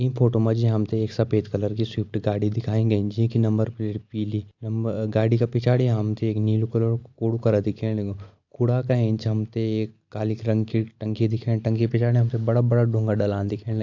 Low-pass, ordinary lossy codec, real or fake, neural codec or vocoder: 7.2 kHz; AAC, 48 kbps; real; none